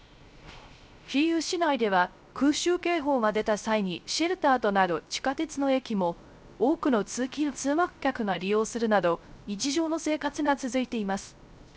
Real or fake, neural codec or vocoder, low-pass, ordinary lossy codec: fake; codec, 16 kHz, 0.3 kbps, FocalCodec; none; none